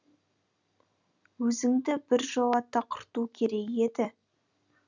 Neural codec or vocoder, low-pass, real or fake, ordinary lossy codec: none; 7.2 kHz; real; none